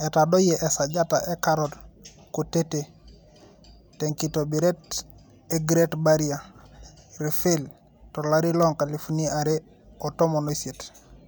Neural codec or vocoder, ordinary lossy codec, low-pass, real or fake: none; none; none; real